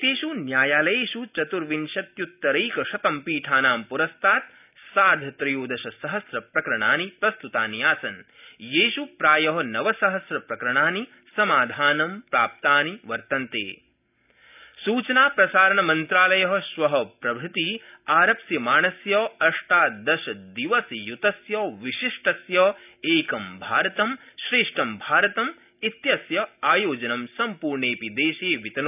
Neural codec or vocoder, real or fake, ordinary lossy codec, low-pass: none; real; none; 3.6 kHz